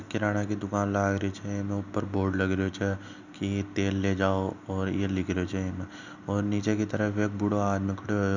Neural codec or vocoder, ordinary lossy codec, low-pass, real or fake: none; none; 7.2 kHz; real